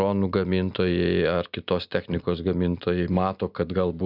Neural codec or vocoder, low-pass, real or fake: none; 5.4 kHz; real